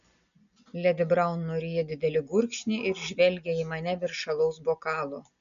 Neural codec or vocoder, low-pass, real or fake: none; 7.2 kHz; real